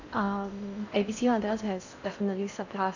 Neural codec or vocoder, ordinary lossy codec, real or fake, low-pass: codec, 16 kHz in and 24 kHz out, 0.8 kbps, FocalCodec, streaming, 65536 codes; none; fake; 7.2 kHz